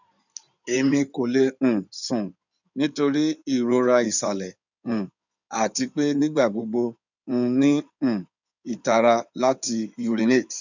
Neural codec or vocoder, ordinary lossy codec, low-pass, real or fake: codec, 16 kHz in and 24 kHz out, 2.2 kbps, FireRedTTS-2 codec; none; 7.2 kHz; fake